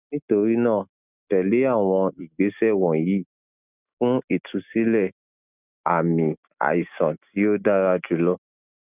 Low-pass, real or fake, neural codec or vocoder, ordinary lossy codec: 3.6 kHz; real; none; none